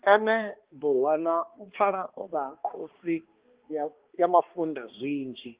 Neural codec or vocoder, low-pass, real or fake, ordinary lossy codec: codec, 16 kHz, 2 kbps, X-Codec, HuBERT features, trained on balanced general audio; 3.6 kHz; fake; Opus, 16 kbps